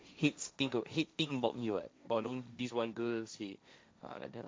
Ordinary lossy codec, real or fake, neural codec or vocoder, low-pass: none; fake; codec, 16 kHz, 1.1 kbps, Voila-Tokenizer; none